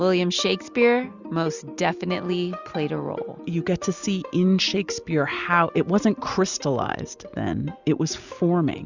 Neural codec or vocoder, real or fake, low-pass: none; real; 7.2 kHz